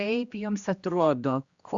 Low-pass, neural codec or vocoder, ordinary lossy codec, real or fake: 7.2 kHz; codec, 16 kHz, 1 kbps, X-Codec, HuBERT features, trained on general audio; Opus, 64 kbps; fake